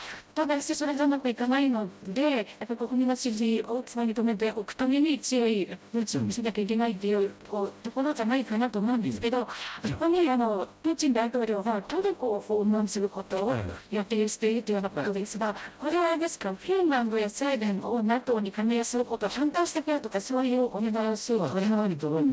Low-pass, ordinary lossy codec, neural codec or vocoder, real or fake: none; none; codec, 16 kHz, 0.5 kbps, FreqCodec, smaller model; fake